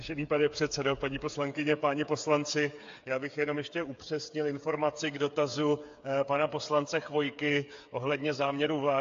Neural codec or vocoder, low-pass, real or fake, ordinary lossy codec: codec, 16 kHz, 8 kbps, FreqCodec, smaller model; 7.2 kHz; fake; AAC, 48 kbps